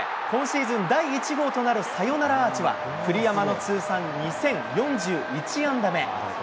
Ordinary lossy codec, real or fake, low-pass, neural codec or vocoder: none; real; none; none